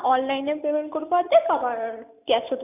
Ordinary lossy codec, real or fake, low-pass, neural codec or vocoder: none; real; 3.6 kHz; none